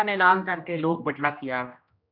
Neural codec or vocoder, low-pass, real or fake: codec, 16 kHz, 1 kbps, X-Codec, HuBERT features, trained on general audio; 5.4 kHz; fake